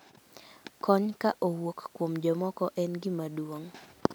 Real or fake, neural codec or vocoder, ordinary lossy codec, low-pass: real; none; none; none